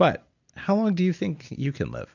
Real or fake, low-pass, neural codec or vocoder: real; 7.2 kHz; none